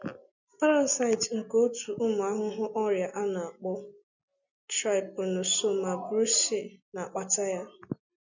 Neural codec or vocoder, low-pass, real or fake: none; 7.2 kHz; real